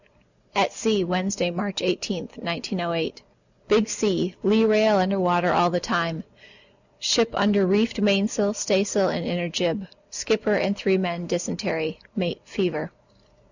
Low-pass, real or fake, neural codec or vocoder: 7.2 kHz; real; none